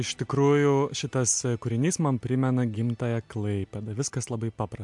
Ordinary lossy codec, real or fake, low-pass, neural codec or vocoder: MP3, 64 kbps; real; 10.8 kHz; none